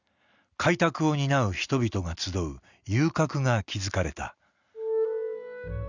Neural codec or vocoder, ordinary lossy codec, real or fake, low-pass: none; none; real; 7.2 kHz